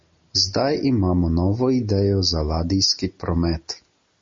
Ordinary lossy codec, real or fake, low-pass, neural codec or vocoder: MP3, 32 kbps; real; 7.2 kHz; none